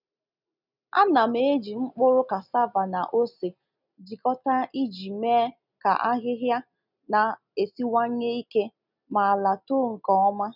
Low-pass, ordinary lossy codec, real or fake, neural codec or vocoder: 5.4 kHz; none; real; none